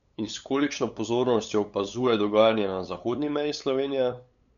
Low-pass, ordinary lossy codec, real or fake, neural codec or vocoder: 7.2 kHz; none; fake; codec, 16 kHz, 8 kbps, FunCodec, trained on LibriTTS, 25 frames a second